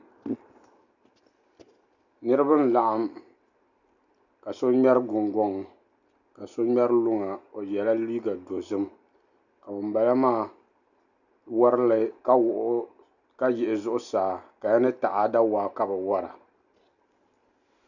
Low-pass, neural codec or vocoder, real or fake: 7.2 kHz; none; real